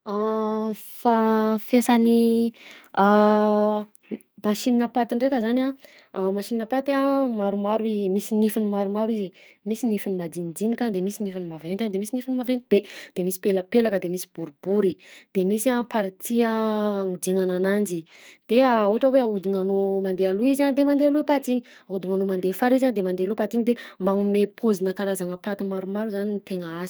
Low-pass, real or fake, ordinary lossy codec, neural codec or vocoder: none; fake; none; codec, 44.1 kHz, 2.6 kbps, SNAC